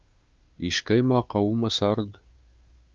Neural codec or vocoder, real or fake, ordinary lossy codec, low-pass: codec, 16 kHz, 2 kbps, FunCodec, trained on Chinese and English, 25 frames a second; fake; Opus, 24 kbps; 7.2 kHz